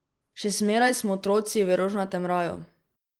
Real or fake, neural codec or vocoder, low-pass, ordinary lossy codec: fake; vocoder, 44.1 kHz, 128 mel bands every 512 samples, BigVGAN v2; 19.8 kHz; Opus, 24 kbps